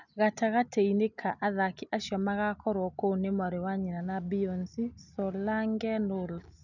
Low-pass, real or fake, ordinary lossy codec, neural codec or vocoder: 7.2 kHz; real; none; none